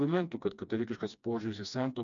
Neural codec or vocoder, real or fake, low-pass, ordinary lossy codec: codec, 16 kHz, 2 kbps, FreqCodec, smaller model; fake; 7.2 kHz; MP3, 64 kbps